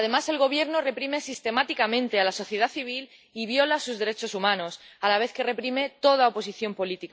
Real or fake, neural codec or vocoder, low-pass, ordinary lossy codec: real; none; none; none